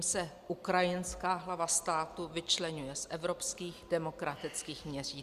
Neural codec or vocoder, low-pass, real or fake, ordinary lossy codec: none; 14.4 kHz; real; Opus, 64 kbps